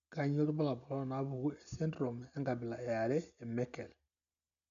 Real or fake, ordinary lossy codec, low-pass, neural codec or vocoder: real; none; 7.2 kHz; none